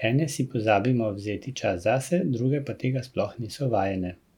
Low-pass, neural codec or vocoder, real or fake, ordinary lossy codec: 19.8 kHz; none; real; none